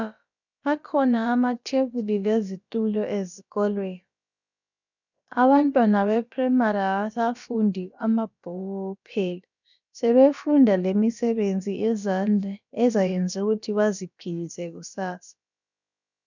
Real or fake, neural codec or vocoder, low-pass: fake; codec, 16 kHz, about 1 kbps, DyCAST, with the encoder's durations; 7.2 kHz